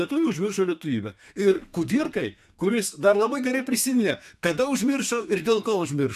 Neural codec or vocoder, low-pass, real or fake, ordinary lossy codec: codec, 32 kHz, 1.9 kbps, SNAC; 14.4 kHz; fake; AAC, 96 kbps